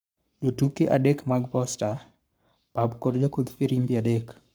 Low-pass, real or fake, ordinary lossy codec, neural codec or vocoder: none; fake; none; codec, 44.1 kHz, 7.8 kbps, Pupu-Codec